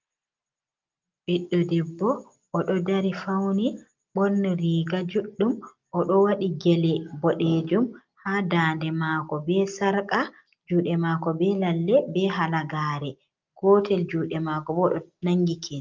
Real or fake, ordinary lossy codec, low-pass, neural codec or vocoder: real; Opus, 24 kbps; 7.2 kHz; none